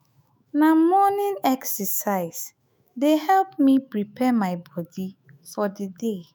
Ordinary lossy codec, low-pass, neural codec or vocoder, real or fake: none; none; autoencoder, 48 kHz, 128 numbers a frame, DAC-VAE, trained on Japanese speech; fake